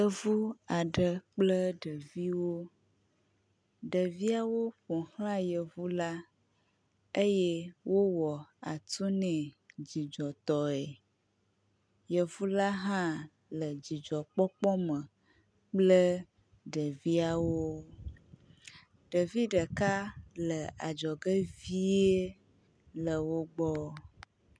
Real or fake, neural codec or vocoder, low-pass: real; none; 9.9 kHz